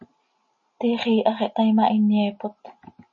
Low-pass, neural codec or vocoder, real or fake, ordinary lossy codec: 7.2 kHz; none; real; MP3, 32 kbps